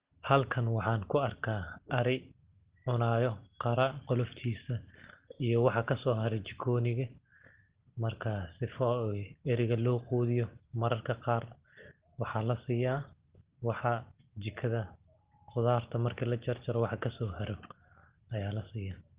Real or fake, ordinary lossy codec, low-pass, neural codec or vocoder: real; Opus, 16 kbps; 3.6 kHz; none